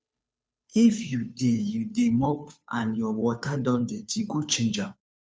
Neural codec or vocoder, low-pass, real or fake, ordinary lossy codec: codec, 16 kHz, 2 kbps, FunCodec, trained on Chinese and English, 25 frames a second; none; fake; none